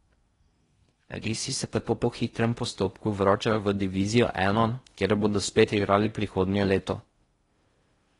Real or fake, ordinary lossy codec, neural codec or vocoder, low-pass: fake; AAC, 32 kbps; codec, 16 kHz in and 24 kHz out, 0.6 kbps, FocalCodec, streaming, 4096 codes; 10.8 kHz